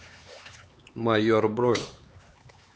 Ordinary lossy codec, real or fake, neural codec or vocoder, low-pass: none; fake; codec, 16 kHz, 4 kbps, X-Codec, HuBERT features, trained on LibriSpeech; none